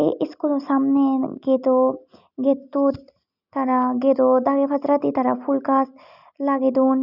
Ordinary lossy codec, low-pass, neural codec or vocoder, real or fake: none; 5.4 kHz; none; real